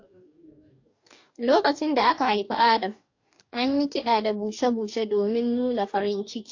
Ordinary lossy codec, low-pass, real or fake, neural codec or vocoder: AAC, 48 kbps; 7.2 kHz; fake; codec, 44.1 kHz, 2.6 kbps, DAC